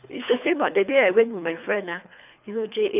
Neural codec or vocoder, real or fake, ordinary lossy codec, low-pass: codec, 24 kHz, 3 kbps, HILCodec; fake; none; 3.6 kHz